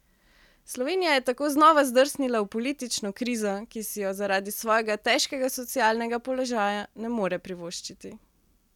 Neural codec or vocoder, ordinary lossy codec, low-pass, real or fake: none; none; 19.8 kHz; real